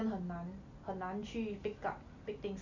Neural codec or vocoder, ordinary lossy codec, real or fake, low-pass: none; Opus, 64 kbps; real; 7.2 kHz